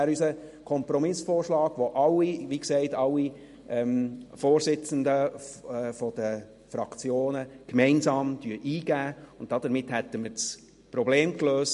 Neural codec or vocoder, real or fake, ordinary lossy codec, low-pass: none; real; MP3, 48 kbps; 14.4 kHz